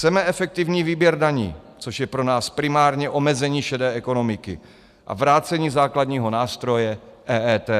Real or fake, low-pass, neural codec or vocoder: real; 14.4 kHz; none